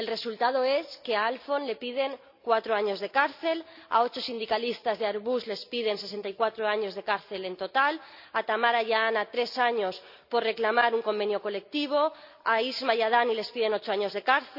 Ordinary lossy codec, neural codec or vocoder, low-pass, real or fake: none; none; 5.4 kHz; real